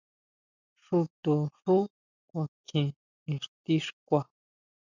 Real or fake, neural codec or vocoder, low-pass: real; none; 7.2 kHz